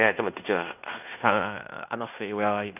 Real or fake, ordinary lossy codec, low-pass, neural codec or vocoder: fake; none; 3.6 kHz; codec, 16 kHz in and 24 kHz out, 0.9 kbps, LongCat-Audio-Codec, fine tuned four codebook decoder